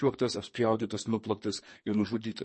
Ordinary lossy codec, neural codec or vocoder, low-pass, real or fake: MP3, 32 kbps; codec, 44.1 kHz, 2.6 kbps, SNAC; 10.8 kHz; fake